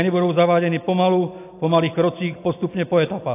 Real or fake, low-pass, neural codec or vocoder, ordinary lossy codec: real; 3.6 kHz; none; AAC, 32 kbps